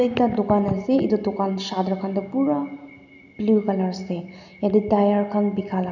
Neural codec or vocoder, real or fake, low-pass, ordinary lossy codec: none; real; 7.2 kHz; none